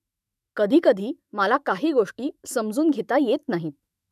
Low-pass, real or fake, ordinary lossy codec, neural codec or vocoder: 14.4 kHz; fake; none; autoencoder, 48 kHz, 128 numbers a frame, DAC-VAE, trained on Japanese speech